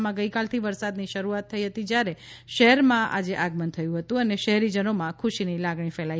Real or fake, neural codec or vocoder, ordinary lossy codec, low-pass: real; none; none; none